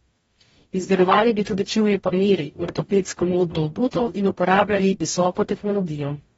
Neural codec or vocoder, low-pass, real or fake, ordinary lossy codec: codec, 44.1 kHz, 0.9 kbps, DAC; 19.8 kHz; fake; AAC, 24 kbps